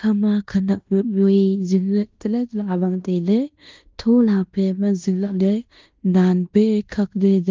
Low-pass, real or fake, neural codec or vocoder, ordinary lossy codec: 7.2 kHz; fake; codec, 16 kHz in and 24 kHz out, 0.9 kbps, LongCat-Audio-Codec, fine tuned four codebook decoder; Opus, 32 kbps